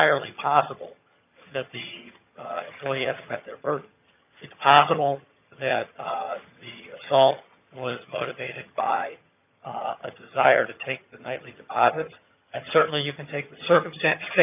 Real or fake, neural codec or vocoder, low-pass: fake; vocoder, 22.05 kHz, 80 mel bands, HiFi-GAN; 3.6 kHz